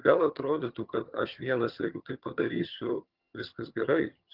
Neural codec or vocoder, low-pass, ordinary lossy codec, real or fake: vocoder, 22.05 kHz, 80 mel bands, HiFi-GAN; 5.4 kHz; Opus, 32 kbps; fake